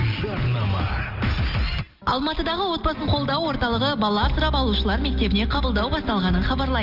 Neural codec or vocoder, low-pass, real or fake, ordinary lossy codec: none; 5.4 kHz; real; Opus, 24 kbps